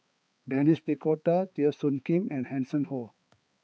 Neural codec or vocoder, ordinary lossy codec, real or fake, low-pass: codec, 16 kHz, 4 kbps, X-Codec, HuBERT features, trained on balanced general audio; none; fake; none